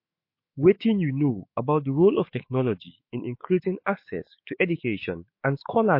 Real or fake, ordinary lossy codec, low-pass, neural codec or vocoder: fake; MP3, 32 kbps; 5.4 kHz; autoencoder, 48 kHz, 128 numbers a frame, DAC-VAE, trained on Japanese speech